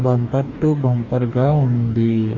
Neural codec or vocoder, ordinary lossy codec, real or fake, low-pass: codec, 44.1 kHz, 2.6 kbps, DAC; Opus, 64 kbps; fake; 7.2 kHz